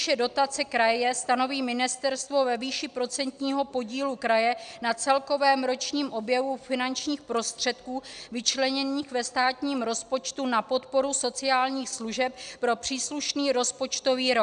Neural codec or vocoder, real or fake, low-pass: none; real; 9.9 kHz